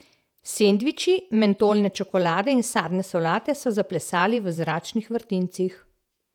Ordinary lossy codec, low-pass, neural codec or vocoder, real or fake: none; 19.8 kHz; vocoder, 48 kHz, 128 mel bands, Vocos; fake